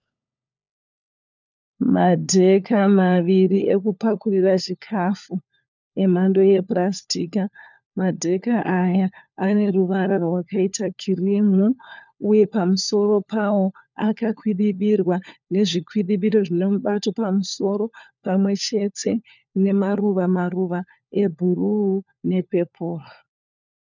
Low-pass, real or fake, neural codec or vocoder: 7.2 kHz; fake; codec, 16 kHz, 4 kbps, FunCodec, trained on LibriTTS, 50 frames a second